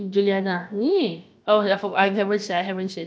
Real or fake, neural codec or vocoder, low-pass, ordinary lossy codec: fake; codec, 16 kHz, about 1 kbps, DyCAST, with the encoder's durations; none; none